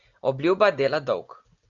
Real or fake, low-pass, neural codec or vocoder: real; 7.2 kHz; none